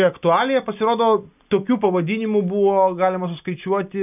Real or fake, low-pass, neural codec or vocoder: real; 3.6 kHz; none